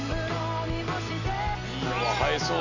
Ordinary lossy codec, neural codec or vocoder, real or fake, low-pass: none; none; real; 7.2 kHz